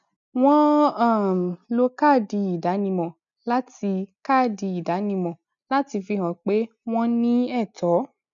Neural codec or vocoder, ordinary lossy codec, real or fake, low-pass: none; none; real; 7.2 kHz